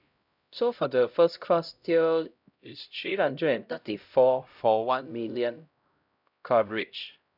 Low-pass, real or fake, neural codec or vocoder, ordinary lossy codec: 5.4 kHz; fake; codec, 16 kHz, 0.5 kbps, X-Codec, HuBERT features, trained on LibriSpeech; none